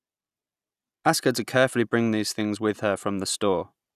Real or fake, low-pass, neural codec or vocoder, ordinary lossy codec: real; 14.4 kHz; none; none